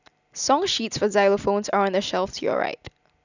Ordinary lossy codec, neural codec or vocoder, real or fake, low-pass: none; none; real; 7.2 kHz